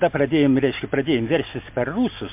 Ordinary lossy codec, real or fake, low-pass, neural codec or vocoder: MP3, 32 kbps; real; 3.6 kHz; none